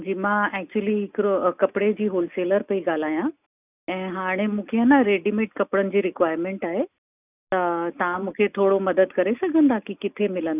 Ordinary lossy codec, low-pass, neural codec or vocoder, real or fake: none; 3.6 kHz; none; real